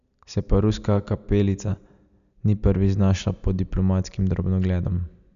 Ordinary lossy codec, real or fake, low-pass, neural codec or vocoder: none; real; 7.2 kHz; none